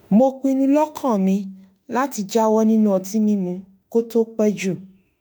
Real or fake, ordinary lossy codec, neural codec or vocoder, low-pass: fake; none; autoencoder, 48 kHz, 32 numbers a frame, DAC-VAE, trained on Japanese speech; none